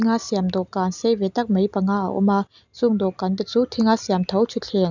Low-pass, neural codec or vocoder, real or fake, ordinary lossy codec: 7.2 kHz; none; real; none